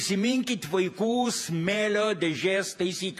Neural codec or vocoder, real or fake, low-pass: vocoder, 48 kHz, 128 mel bands, Vocos; fake; 14.4 kHz